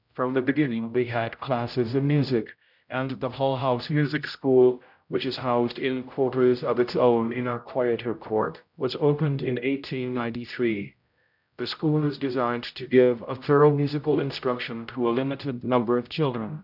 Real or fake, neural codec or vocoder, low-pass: fake; codec, 16 kHz, 0.5 kbps, X-Codec, HuBERT features, trained on general audio; 5.4 kHz